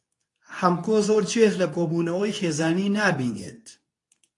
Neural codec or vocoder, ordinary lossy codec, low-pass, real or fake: codec, 24 kHz, 0.9 kbps, WavTokenizer, medium speech release version 2; AAC, 48 kbps; 10.8 kHz; fake